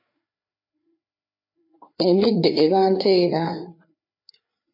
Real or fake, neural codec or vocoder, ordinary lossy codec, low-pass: fake; codec, 16 kHz, 4 kbps, FreqCodec, larger model; MP3, 32 kbps; 5.4 kHz